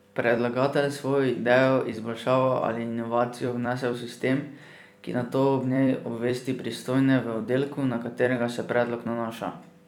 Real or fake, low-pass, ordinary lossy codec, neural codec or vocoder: fake; 19.8 kHz; none; vocoder, 44.1 kHz, 128 mel bands every 256 samples, BigVGAN v2